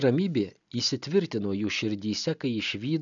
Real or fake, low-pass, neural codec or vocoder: real; 7.2 kHz; none